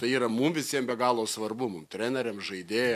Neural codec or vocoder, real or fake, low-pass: none; real; 14.4 kHz